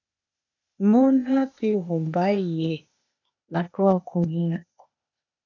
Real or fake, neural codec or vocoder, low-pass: fake; codec, 16 kHz, 0.8 kbps, ZipCodec; 7.2 kHz